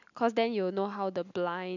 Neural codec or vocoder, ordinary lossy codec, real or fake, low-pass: none; none; real; 7.2 kHz